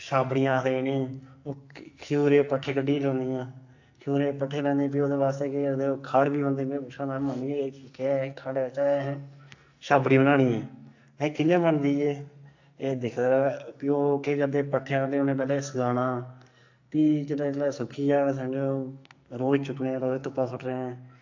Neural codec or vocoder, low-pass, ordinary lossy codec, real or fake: codec, 44.1 kHz, 2.6 kbps, SNAC; 7.2 kHz; AAC, 48 kbps; fake